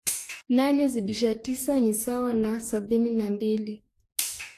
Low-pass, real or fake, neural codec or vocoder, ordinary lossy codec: 14.4 kHz; fake; codec, 44.1 kHz, 2.6 kbps, DAC; AAC, 64 kbps